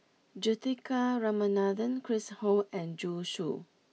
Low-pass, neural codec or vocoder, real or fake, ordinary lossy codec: none; none; real; none